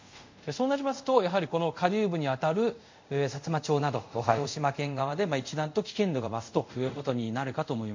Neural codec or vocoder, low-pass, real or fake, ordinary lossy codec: codec, 24 kHz, 0.5 kbps, DualCodec; 7.2 kHz; fake; MP3, 48 kbps